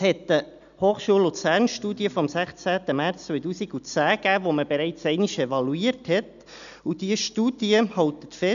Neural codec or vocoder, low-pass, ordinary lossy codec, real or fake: none; 7.2 kHz; none; real